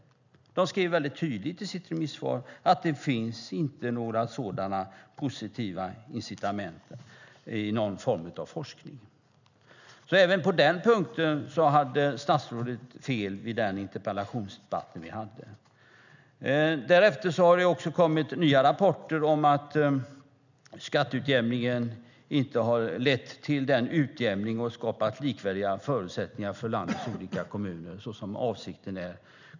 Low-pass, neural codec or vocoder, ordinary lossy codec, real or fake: 7.2 kHz; none; none; real